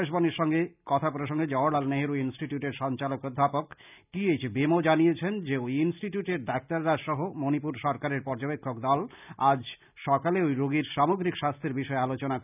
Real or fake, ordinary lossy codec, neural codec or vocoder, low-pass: real; none; none; 3.6 kHz